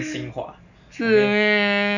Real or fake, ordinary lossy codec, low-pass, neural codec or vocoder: real; none; 7.2 kHz; none